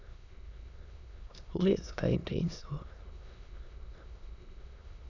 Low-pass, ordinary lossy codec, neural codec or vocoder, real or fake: 7.2 kHz; none; autoencoder, 22.05 kHz, a latent of 192 numbers a frame, VITS, trained on many speakers; fake